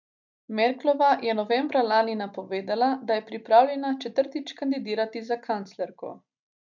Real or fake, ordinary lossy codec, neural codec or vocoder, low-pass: real; none; none; 7.2 kHz